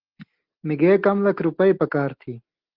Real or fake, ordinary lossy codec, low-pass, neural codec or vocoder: real; Opus, 16 kbps; 5.4 kHz; none